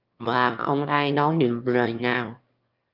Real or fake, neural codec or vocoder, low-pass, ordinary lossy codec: fake; autoencoder, 22.05 kHz, a latent of 192 numbers a frame, VITS, trained on one speaker; 5.4 kHz; Opus, 24 kbps